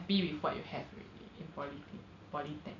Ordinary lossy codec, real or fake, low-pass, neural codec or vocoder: none; real; 7.2 kHz; none